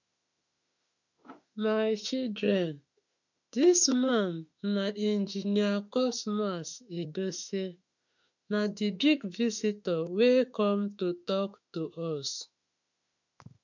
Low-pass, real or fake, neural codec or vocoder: 7.2 kHz; fake; autoencoder, 48 kHz, 32 numbers a frame, DAC-VAE, trained on Japanese speech